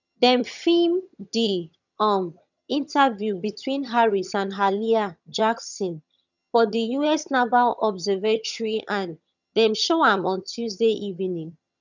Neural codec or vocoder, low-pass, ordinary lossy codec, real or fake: vocoder, 22.05 kHz, 80 mel bands, HiFi-GAN; 7.2 kHz; none; fake